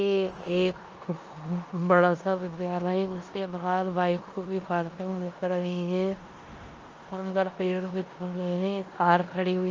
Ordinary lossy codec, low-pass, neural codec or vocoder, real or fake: Opus, 24 kbps; 7.2 kHz; codec, 16 kHz in and 24 kHz out, 0.9 kbps, LongCat-Audio-Codec, four codebook decoder; fake